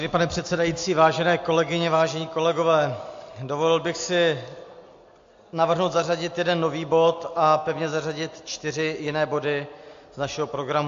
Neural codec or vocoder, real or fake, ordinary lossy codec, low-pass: none; real; AAC, 48 kbps; 7.2 kHz